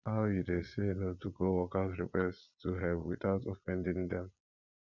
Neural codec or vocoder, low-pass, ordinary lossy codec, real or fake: vocoder, 22.05 kHz, 80 mel bands, Vocos; 7.2 kHz; MP3, 64 kbps; fake